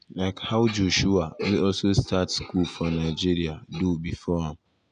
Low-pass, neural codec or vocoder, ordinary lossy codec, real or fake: 9.9 kHz; none; none; real